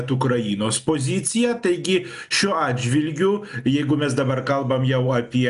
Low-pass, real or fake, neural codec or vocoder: 10.8 kHz; real; none